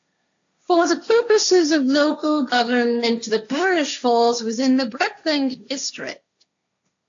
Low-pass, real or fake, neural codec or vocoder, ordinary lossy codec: 7.2 kHz; fake; codec, 16 kHz, 1.1 kbps, Voila-Tokenizer; AAC, 64 kbps